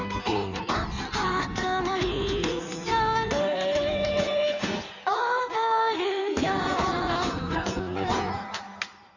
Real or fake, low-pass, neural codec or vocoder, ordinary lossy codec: fake; 7.2 kHz; codec, 16 kHz in and 24 kHz out, 1.1 kbps, FireRedTTS-2 codec; none